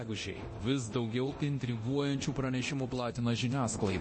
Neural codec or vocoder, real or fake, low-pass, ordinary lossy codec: codec, 24 kHz, 0.9 kbps, DualCodec; fake; 9.9 kHz; MP3, 32 kbps